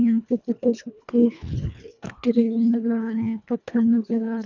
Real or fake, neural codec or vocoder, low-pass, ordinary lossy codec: fake; codec, 24 kHz, 1.5 kbps, HILCodec; 7.2 kHz; none